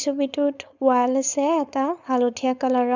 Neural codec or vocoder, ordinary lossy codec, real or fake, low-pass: codec, 16 kHz, 4.8 kbps, FACodec; none; fake; 7.2 kHz